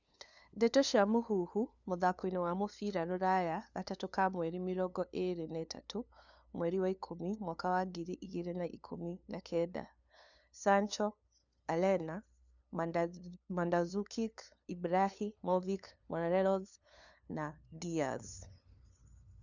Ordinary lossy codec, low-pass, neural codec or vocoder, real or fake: none; 7.2 kHz; codec, 16 kHz, 2 kbps, FunCodec, trained on LibriTTS, 25 frames a second; fake